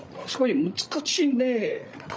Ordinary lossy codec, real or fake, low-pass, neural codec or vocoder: none; fake; none; codec, 16 kHz, 16 kbps, FreqCodec, larger model